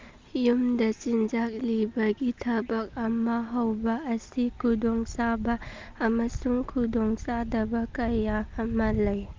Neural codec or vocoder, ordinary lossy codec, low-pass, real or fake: none; Opus, 32 kbps; 7.2 kHz; real